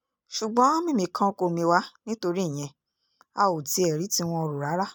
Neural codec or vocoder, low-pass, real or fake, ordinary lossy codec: none; none; real; none